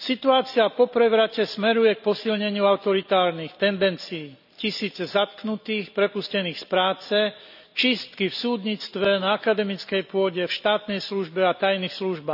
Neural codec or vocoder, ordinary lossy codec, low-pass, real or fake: none; none; 5.4 kHz; real